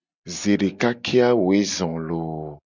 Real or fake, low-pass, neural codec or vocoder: real; 7.2 kHz; none